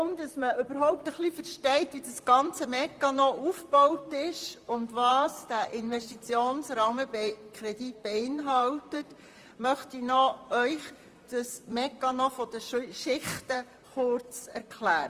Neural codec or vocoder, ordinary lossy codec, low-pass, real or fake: vocoder, 44.1 kHz, 128 mel bands, Pupu-Vocoder; Opus, 32 kbps; 14.4 kHz; fake